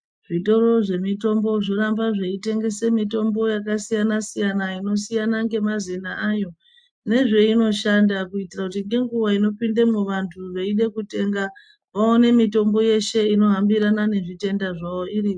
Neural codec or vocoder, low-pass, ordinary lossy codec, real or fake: none; 9.9 kHz; MP3, 64 kbps; real